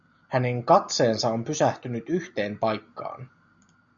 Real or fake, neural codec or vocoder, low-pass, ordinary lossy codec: real; none; 7.2 kHz; MP3, 64 kbps